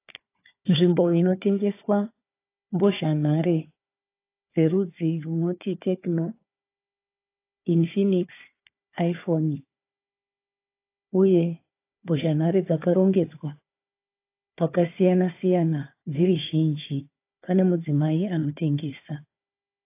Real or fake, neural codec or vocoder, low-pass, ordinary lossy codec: fake; codec, 16 kHz, 4 kbps, FunCodec, trained on Chinese and English, 50 frames a second; 3.6 kHz; AAC, 24 kbps